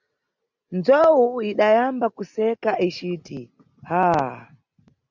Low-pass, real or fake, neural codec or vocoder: 7.2 kHz; real; none